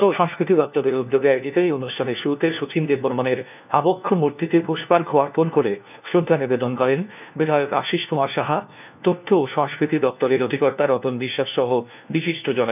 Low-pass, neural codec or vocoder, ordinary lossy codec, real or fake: 3.6 kHz; codec, 16 kHz, 1 kbps, FunCodec, trained on LibriTTS, 50 frames a second; none; fake